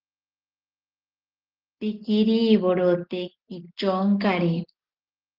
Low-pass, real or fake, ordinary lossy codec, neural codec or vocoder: 5.4 kHz; real; Opus, 16 kbps; none